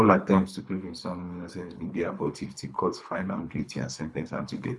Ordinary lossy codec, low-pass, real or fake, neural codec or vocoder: none; none; fake; codec, 24 kHz, 3 kbps, HILCodec